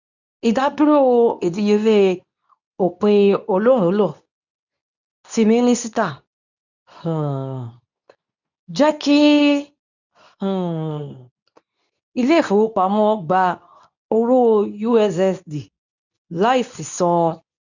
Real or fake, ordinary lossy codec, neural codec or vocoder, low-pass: fake; none; codec, 24 kHz, 0.9 kbps, WavTokenizer, medium speech release version 2; 7.2 kHz